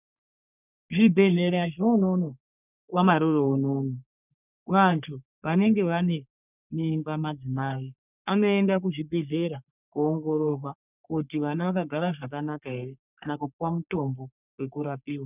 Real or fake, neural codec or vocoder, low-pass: fake; codec, 44.1 kHz, 3.4 kbps, Pupu-Codec; 3.6 kHz